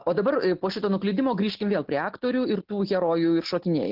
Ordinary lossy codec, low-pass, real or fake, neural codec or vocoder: Opus, 32 kbps; 5.4 kHz; real; none